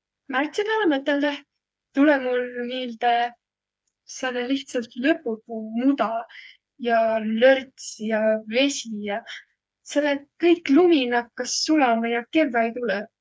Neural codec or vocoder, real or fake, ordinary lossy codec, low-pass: codec, 16 kHz, 4 kbps, FreqCodec, smaller model; fake; none; none